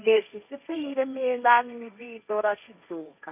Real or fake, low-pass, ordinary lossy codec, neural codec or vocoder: fake; 3.6 kHz; none; codec, 16 kHz, 1.1 kbps, Voila-Tokenizer